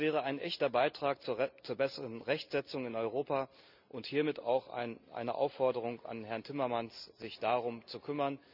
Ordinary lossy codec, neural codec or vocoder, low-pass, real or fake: none; none; 5.4 kHz; real